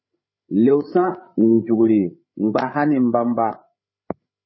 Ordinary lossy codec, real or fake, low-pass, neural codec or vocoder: MP3, 24 kbps; fake; 7.2 kHz; codec, 16 kHz, 8 kbps, FreqCodec, larger model